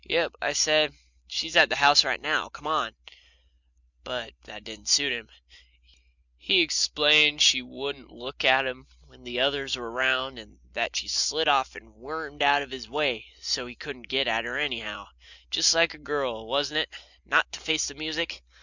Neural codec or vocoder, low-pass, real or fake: none; 7.2 kHz; real